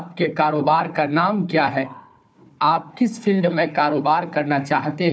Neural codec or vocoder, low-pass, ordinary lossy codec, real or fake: codec, 16 kHz, 4 kbps, FunCodec, trained on Chinese and English, 50 frames a second; none; none; fake